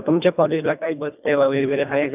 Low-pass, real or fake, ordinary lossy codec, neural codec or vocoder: 3.6 kHz; fake; none; codec, 24 kHz, 1.5 kbps, HILCodec